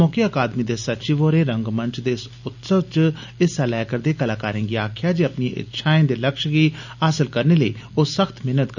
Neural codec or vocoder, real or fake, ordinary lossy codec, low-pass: none; real; none; 7.2 kHz